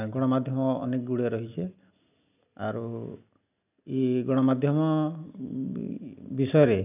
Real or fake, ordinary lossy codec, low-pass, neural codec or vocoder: real; none; 3.6 kHz; none